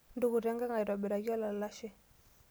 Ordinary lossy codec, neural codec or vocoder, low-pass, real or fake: none; none; none; real